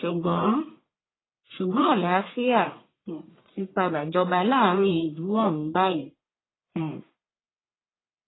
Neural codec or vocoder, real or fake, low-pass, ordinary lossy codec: codec, 44.1 kHz, 1.7 kbps, Pupu-Codec; fake; 7.2 kHz; AAC, 16 kbps